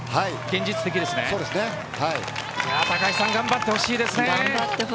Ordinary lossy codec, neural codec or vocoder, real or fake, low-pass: none; none; real; none